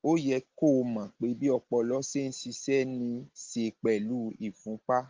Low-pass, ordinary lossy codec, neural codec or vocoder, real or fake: 7.2 kHz; Opus, 16 kbps; none; real